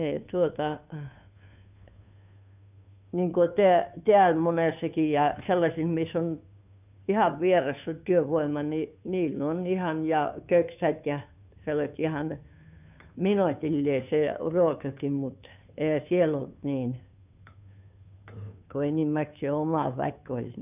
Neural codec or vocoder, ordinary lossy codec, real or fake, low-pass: codec, 16 kHz, 2 kbps, FunCodec, trained on Chinese and English, 25 frames a second; none; fake; 3.6 kHz